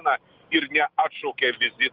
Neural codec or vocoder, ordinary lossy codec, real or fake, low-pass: none; Opus, 64 kbps; real; 5.4 kHz